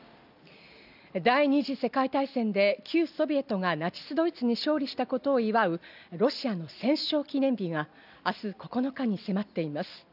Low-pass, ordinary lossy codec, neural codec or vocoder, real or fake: 5.4 kHz; none; none; real